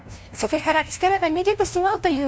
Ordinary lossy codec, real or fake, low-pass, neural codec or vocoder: none; fake; none; codec, 16 kHz, 0.5 kbps, FunCodec, trained on LibriTTS, 25 frames a second